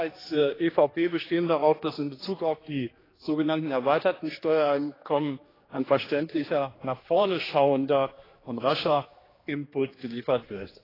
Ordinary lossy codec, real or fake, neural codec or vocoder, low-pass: AAC, 24 kbps; fake; codec, 16 kHz, 2 kbps, X-Codec, HuBERT features, trained on general audio; 5.4 kHz